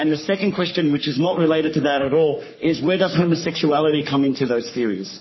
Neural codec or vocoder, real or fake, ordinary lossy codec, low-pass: codec, 44.1 kHz, 3.4 kbps, Pupu-Codec; fake; MP3, 24 kbps; 7.2 kHz